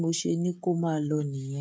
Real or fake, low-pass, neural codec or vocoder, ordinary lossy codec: fake; none; codec, 16 kHz, 16 kbps, FreqCodec, smaller model; none